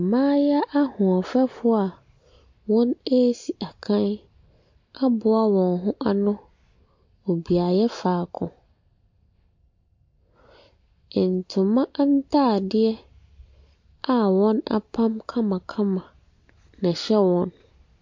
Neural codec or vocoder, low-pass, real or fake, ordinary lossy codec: none; 7.2 kHz; real; MP3, 48 kbps